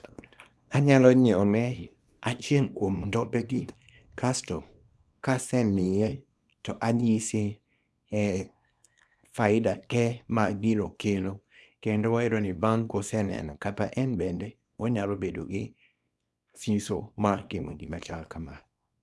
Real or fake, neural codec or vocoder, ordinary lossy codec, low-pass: fake; codec, 24 kHz, 0.9 kbps, WavTokenizer, small release; none; none